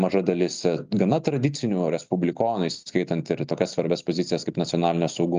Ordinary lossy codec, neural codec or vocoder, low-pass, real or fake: Opus, 24 kbps; none; 7.2 kHz; real